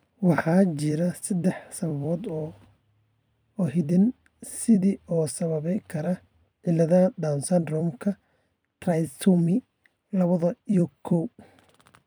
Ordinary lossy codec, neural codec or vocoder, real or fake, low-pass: none; vocoder, 44.1 kHz, 128 mel bands every 256 samples, BigVGAN v2; fake; none